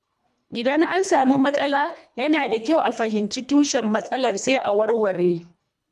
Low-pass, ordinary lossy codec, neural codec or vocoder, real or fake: none; none; codec, 24 kHz, 1.5 kbps, HILCodec; fake